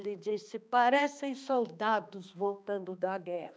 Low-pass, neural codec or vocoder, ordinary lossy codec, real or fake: none; codec, 16 kHz, 2 kbps, X-Codec, HuBERT features, trained on balanced general audio; none; fake